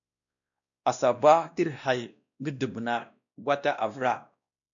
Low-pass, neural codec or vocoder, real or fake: 7.2 kHz; codec, 16 kHz, 1 kbps, X-Codec, WavLM features, trained on Multilingual LibriSpeech; fake